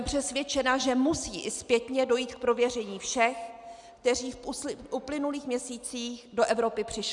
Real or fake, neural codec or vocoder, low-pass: real; none; 10.8 kHz